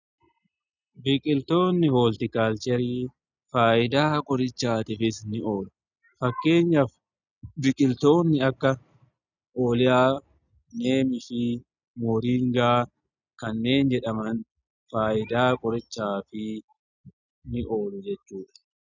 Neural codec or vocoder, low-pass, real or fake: none; 7.2 kHz; real